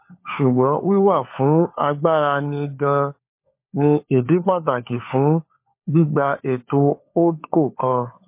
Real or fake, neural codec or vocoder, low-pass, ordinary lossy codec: fake; codec, 16 kHz, 4 kbps, FunCodec, trained on LibriTTS, 50 frames a second; 3.6 kHz; MP3, 24 kbps